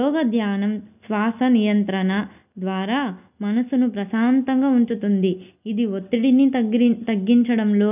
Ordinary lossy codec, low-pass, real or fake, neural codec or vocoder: none; 3.6 kHz; real; none